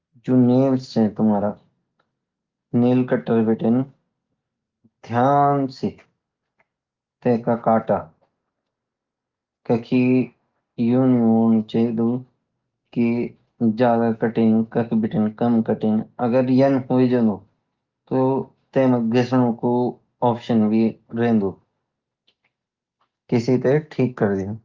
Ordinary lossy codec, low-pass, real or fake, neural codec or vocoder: Opus, 32 kbps; 7.2 kHz; real; none